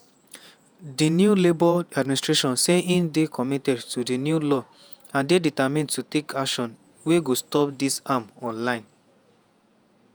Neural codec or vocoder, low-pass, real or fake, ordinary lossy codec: vocoder, 48 kHz, 128 mel bands, Vocos; none; fake; none